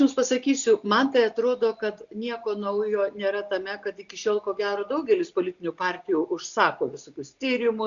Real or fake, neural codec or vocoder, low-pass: real; none; 7.2 kHz